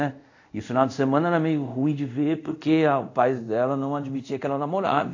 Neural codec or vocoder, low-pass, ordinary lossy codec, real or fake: codec, 24 kHz, 0.5 kbps, DualCodec; 7.2 kHz; AAC, 48 kbps; fake